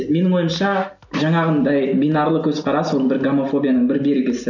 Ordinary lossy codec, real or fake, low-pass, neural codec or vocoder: none; real; 7.2 kHz; none